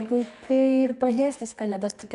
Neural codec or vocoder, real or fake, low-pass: codec, 24 kHz, 0.9 kbps, WavTokenizer, medium music audio release; fake; 10.8 kHz